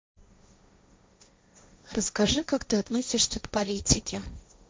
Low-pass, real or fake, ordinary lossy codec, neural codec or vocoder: none; fake; none; codec, 16 kHz, 1.1 kbps, Voila-Tokenizer